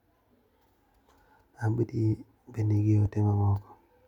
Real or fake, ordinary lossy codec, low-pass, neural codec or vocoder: real; MP3, 96 kbps; 19.8 kHz; none